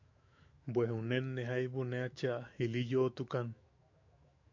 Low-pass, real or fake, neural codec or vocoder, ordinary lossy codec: 7.2 kHz; fake; autoencoder, 48 kHz, 128 numbers a frame, DAC-VAE, trained on Japanese speech; MP3, 48 kbps